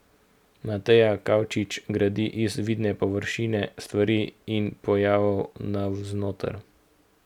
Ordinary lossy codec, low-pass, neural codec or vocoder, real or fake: none; 19.8 kHz; none; real